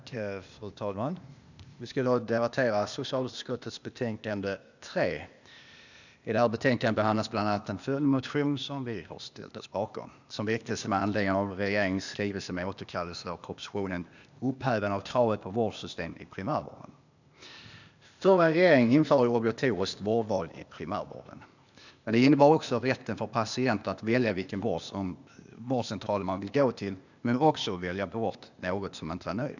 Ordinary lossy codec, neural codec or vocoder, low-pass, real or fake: none; codec, 16 kHz, 0.8 kbps, ZipCodec; 7.2 kHz; fake